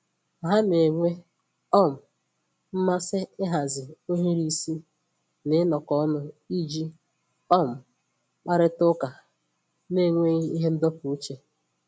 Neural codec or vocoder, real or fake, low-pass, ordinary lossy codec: none; real; none; none